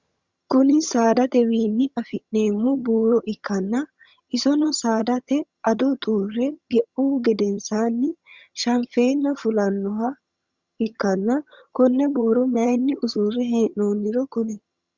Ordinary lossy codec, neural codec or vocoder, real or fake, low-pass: Opus, 64 kbps; vocoder, 22.05 kHz, 80 mel bands, HiFi-GAN; fake; 7.2 kHz